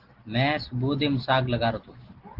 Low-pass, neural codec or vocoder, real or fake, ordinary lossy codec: 5.4 kHz; none; real; Opus, 16 kbps